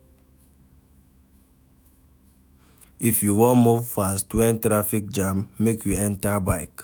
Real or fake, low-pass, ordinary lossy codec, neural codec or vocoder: fake; none; none; autoencoder, 48 kHz, 128 numbers a frame, DAC-VAE, trained on Japanese speech